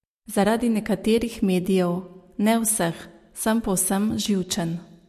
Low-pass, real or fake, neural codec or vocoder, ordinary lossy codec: 14.4 kHz; real; none; MP3, 64 kbps